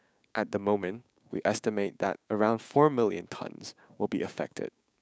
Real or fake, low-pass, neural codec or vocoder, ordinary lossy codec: fake; none; codec, 16 kHz, 6 kbps, DAC; none